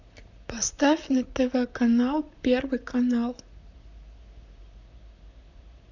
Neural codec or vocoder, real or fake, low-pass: vocoder, 44.1 kHz, 128 mel bands, Pupu-Vocoder; fake; 7.2 kHz